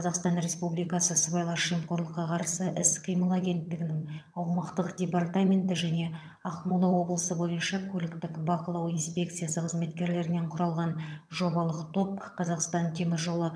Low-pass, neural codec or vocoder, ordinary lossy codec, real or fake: none; vocoder, 22.05 kHz, 80 mel bands, HiFi-GAN; none; fake